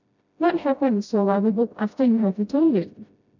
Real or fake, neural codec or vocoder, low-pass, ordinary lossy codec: fake; codec, 16 kHz, 0.5 kbps, FreqCodec, smaller model; 7.2 kHz; none